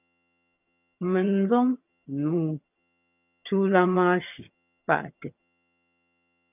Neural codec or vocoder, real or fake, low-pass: vocoder, 22.05 kHz, 80 mel bands, HiFi-GAN; fake; 3.6 kHz